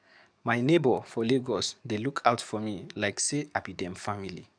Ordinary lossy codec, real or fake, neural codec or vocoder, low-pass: none; fake; codec, 44.1 kHz, 7.8 kbps, DAC; 9.9 kHz